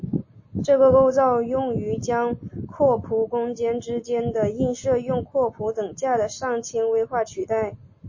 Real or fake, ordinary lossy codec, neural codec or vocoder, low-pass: real; MP3, 32 kbps; none; 7.2 kHz